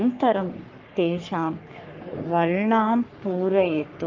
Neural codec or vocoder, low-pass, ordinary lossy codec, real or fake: codec, 44.1 kHz, 3.4 kbps, Pupu-Codec; 7.2 kHz; Opus, 32 kbps; fake